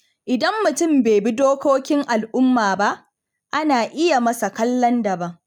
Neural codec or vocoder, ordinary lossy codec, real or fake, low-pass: none; none; real; 19.8 kHz